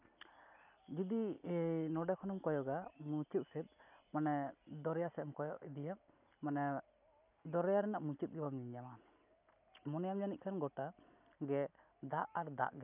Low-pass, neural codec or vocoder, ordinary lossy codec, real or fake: 3.6 kHz; none; none; real